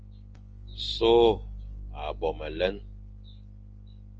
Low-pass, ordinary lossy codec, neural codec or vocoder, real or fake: 7.2 kHz; Opus, 24 kbps; none; real